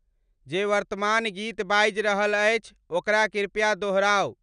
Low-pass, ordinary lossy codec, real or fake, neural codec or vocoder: 9.9 kHz; none; real; none